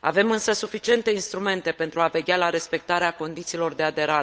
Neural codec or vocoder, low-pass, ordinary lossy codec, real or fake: codec, 16 kHz, 8 kbps, FunCodec, trained on Chinese and English, 25 frames a second; none; none; fake